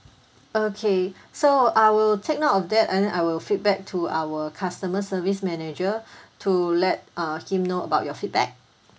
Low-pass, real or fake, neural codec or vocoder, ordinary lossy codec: none; real; none; none